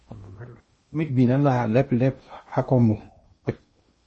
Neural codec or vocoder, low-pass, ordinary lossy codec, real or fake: codec, 16 kHz in and 24 kHz out, 0.8 kbps, FocalCodec, streaming, 65536 codes; 10.8 kHz; MP3, 32 kbps; fake